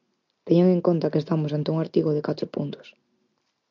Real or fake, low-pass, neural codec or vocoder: real; 7.2 kHz; none